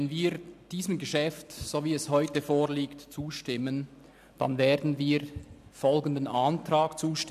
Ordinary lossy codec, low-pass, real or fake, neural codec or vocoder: MP3, 96 kbps; 14.4 kHz; real; none